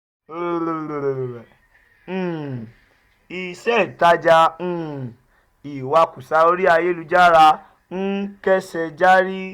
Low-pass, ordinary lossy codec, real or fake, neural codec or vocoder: 19.8 kHz; none; real; none